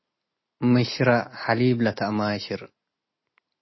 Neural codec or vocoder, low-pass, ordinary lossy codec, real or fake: none; 7.2 kHz; MP3, 24 kbps; real